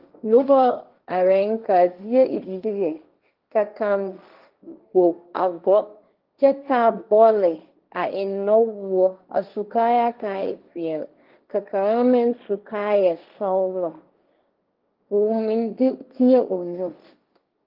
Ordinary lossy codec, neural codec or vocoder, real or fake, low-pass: Opus, 32 kbps; codec, 16 kHz, 1.1 kbps, Voila-Tokenizer; fake; 5.4 kHz